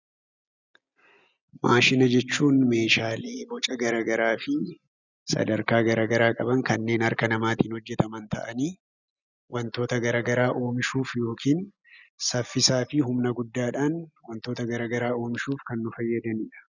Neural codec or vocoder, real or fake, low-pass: none; real; 7.2 kHz